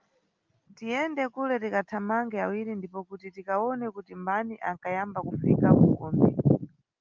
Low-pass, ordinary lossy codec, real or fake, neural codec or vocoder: 7.2 kHz; Opus, 24 kbps; real; none